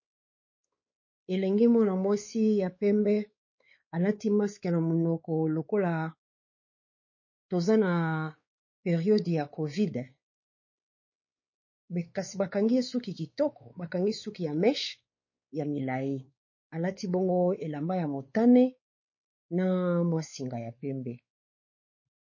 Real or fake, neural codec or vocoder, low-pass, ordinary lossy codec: fake; codec, 16 kHz, 4 kbps, X-Codec, WavLM features, trained on Multilingual LibriSpeech; 7.2 kHz; MP3, 32 kbps